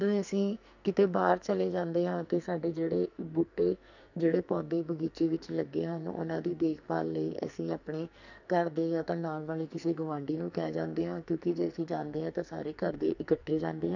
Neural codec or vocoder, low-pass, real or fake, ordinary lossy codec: codec, 44.1 kHz, 2.6 kbps, SNAC; 7.2 kHz; fake; none